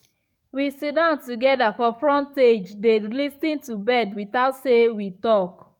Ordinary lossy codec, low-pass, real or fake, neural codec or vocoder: MP3, 96 kbps; 19.8 kHz; fake; codec, 44.1 kHz, 7.8 kbps, DAC